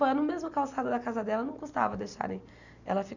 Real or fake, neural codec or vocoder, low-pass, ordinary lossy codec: real; none; 7.2 kHz; none